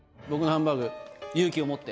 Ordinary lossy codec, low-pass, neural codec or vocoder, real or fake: none; none; none; real